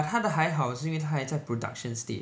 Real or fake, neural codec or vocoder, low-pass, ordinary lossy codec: real; none; none; none